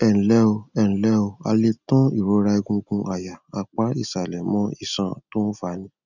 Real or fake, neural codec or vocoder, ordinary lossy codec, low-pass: real; none; none; 7.2 kHz